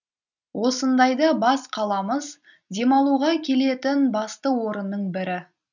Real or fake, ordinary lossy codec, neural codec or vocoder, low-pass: real; none; none; 7.2 kHz